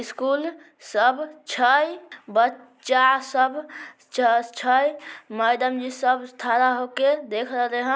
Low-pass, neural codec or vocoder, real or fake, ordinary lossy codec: none; none; real; none